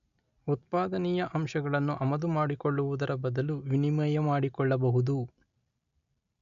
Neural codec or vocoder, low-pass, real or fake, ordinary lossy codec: none; 7.2 kHz; real; none